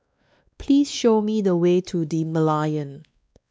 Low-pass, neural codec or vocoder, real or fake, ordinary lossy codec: none; codec, 16 kHz, 2 kbps, X-Codec, WavLM features, trained on Multilingual LibriSpeech; fake; none